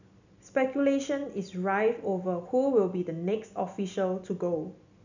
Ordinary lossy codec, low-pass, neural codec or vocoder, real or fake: none; 7.2 kHz; none; real